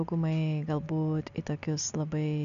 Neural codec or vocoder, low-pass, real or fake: none; 7.2 kHz; real